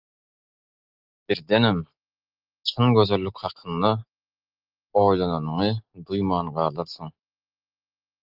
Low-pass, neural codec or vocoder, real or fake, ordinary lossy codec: 5.4 kHz; none; real; Opus, 32 kbps